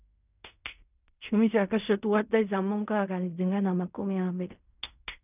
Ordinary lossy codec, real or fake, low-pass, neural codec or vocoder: none; fake; 3.6 kHz; codec, 16 kHz in and 24 kHz out, 0.4 kbps, LongCat-Audio-Codec, fine tuned four codebook decoder